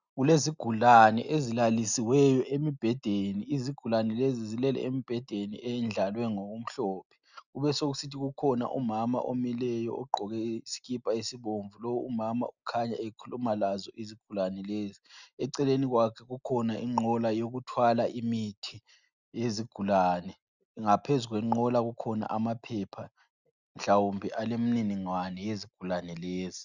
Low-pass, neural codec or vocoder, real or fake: 7.2 kHz; none; real